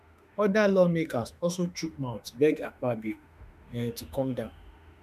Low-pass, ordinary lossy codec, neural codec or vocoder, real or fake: 14.4 kHz; none; autoencoder, 48 kHz, 32 numbers a frame, DAC-VAE, trained on Japanese speech; fake